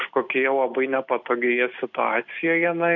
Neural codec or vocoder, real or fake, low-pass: none; real; 7.2 kHz